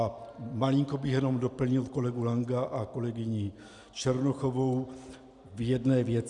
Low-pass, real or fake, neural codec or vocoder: 10.8 kHz; real; none